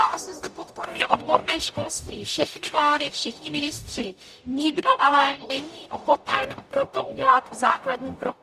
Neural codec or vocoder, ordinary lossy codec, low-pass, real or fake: codec, 44.1 kHz, 0.9 kbps, DAC; Opus, 64 kbps; 14.4 kHz; fake